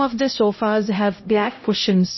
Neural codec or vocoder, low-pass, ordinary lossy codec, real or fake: codec, 16 kHz, 0.5 kbps, X-Codec, HuBERT features, trained on LibriSpeech; 7.2 kHz; MP3, 24 kbps; fake